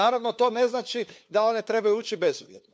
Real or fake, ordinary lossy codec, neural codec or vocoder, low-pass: fake; none; codec, 16 kHz, 2 kbps, FunCodec, trained on LibriTTS, 25 frames a second; none